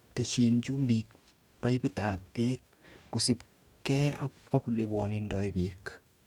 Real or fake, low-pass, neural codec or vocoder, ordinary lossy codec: fake; 19.8 kHz; codec, 44.1 kHz, 2.6 kbps, DAC; none